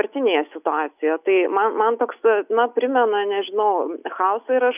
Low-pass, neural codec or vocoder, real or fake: 3.6 kHz; none; real